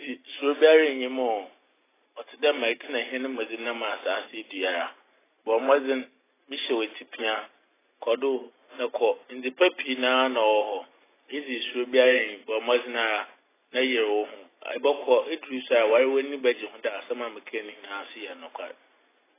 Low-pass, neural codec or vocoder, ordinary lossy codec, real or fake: 3.6 kHz; none; AAC, 16 kbps; real